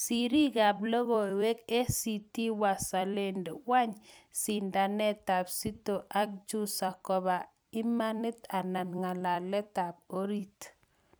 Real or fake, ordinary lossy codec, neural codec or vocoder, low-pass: fake; none; vocoder, 44.1 kHz, 128 mel bands every 256 samples, BigVGAN v2; none